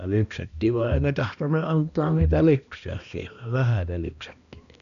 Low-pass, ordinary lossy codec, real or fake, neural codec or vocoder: 7.2 kHz; none; fake; codec, 16 kHz, 1 kbps, X-Codec, HuBERT features, trained on balanced general audio